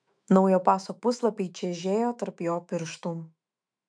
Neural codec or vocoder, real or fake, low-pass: autoencoder, 48 kHz, 128 numbers a frame, DAC-VAE, trained on Japanese speech; fake; 9.9 kHz